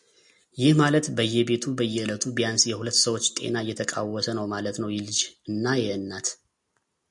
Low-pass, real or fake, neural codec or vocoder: 10.8 kHz; real; none